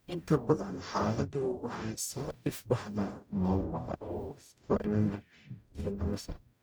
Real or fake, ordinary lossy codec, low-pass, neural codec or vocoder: fake; none; none; codec, 44.1 kHz, 0.9 kbps, DAC